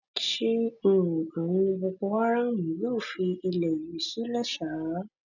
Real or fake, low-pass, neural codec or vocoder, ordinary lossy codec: real; 7.2 kHz; none; none